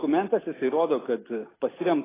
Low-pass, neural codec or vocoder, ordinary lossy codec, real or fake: 3.6 kHz; none; AAC, 16 kbps; real